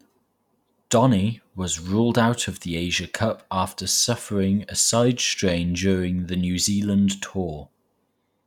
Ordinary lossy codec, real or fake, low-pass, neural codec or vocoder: none; real; 19.8 kHz; none